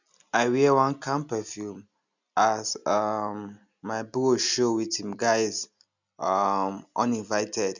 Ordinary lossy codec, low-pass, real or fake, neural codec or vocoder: none; 7.2 kHz; real; none